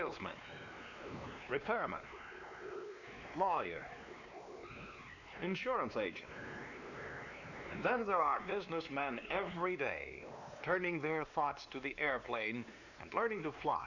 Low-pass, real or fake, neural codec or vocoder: 7.2 kHz; fake; codec, 16 kHz, 2 kbps, X-Codec, WavLM features, trained on Multilingual LibriSpeech